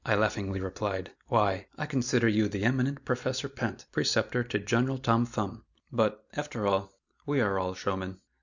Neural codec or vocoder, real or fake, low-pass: none; real; 7.2 kHz